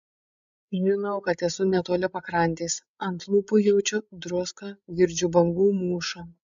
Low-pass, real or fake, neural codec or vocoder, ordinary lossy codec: 7.2 kHz; fake; codec, 16 kHz, 16 kbps, FreqCodec, larger model; AAC, 96 kbps